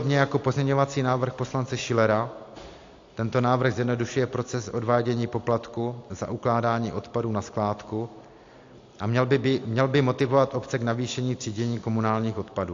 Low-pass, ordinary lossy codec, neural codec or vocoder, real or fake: 7.2 kHz; AAC, 48 kbps; none; real